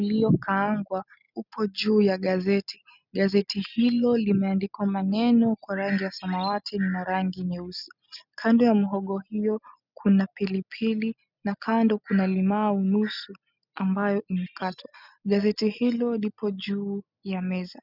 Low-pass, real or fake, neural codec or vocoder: 5.4 kHz; real; none